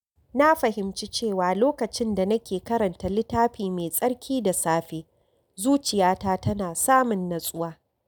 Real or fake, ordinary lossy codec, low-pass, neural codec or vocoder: real; none; none; none